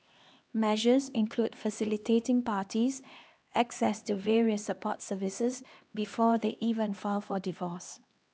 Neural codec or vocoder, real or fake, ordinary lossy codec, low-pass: codec, 16 kHz, 2 kbps, X-Codec, HuBERT features, trained on LibriSpeech; fake; none; none